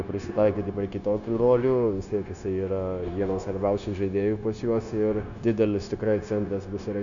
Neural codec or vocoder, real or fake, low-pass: codec, 16 kHz, 0.9 kbps, LongCat-Audio-Codec; fake; 7.2 kHz